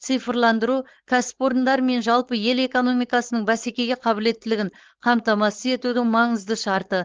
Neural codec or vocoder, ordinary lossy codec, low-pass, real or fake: codec, 16 kHz, 4.8 kbps, FACodec; Opus, 16 kbps; 7.2 kHz; fake